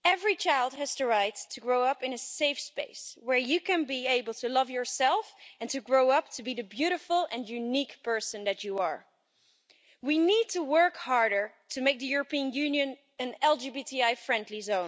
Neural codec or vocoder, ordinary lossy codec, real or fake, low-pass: none; none; real; none